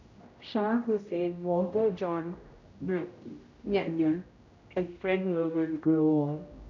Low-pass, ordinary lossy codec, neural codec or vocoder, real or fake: 7.2 kHz; none; codec, 16 kHz, 0.5 kbps, X-Codec, HuBERT features, trained on general audio; fake